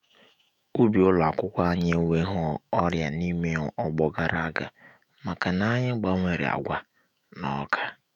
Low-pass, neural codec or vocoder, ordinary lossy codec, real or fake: 19.8 kHz; autoencoder, 48 kHz, 128 numbers a frame, DAC-VAE, trained on Japanese speech; none; fake